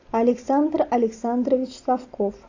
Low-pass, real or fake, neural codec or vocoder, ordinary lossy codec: 7.2 kHz; real; none; AAC, 48 kbps